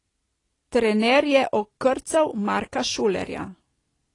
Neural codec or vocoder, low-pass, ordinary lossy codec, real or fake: none; 10.8 kHz; AAC, 32 kbps; real